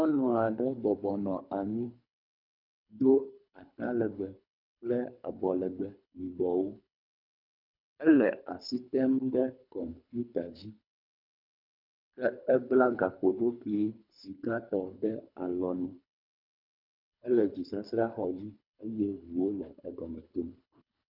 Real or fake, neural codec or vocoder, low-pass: fake; codec, 24 kHz, 3 kbps, HILCodec; 5.4 kHz